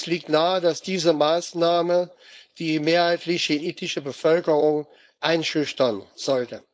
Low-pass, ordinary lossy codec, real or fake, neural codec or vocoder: none; none; fake; codec, 16 kHz, 4.8 kbps, FACodec